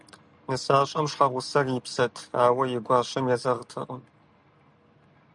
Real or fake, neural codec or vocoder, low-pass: real; none; 10.8 kHz